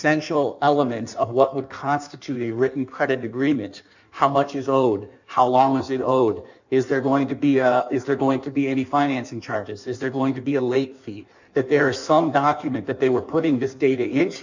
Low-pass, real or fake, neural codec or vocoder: 7.2 kHz; fake; codec, 16 kHz in and 24 kHz out, 1.1 kbps, FireRedTTS-2 codec